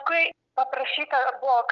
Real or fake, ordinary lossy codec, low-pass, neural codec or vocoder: real; Opus, 32 kbps; 7.2 kHz; none